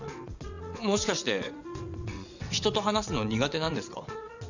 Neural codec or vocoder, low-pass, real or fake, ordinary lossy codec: vocoder, 22.05 kHz, 80 mel bands, WaveNeXt; 7.2 kHz; fake; none